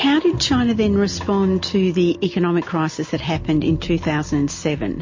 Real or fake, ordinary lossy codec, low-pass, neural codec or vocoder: real; MP3, 32 kbps; 7.2 kHz; none